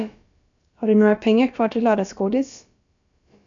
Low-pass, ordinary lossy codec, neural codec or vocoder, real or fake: 7.2 kHz; MP3, 64 kbps; codec, 16 kHz, about 1 kbps, DyCAST, with the encoder's durations; fake